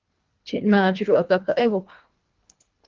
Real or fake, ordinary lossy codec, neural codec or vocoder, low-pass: fake; Opus, 32 kbps; codec, 24 kHz, 1.5 kbps, HILCodec; 7.2 kHz